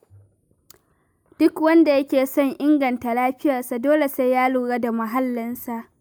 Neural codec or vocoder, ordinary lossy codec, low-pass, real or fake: none; none; none; real